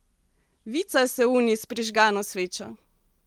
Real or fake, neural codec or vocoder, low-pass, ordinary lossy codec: real; none; 19.8 kHz; Opus, 24 kbps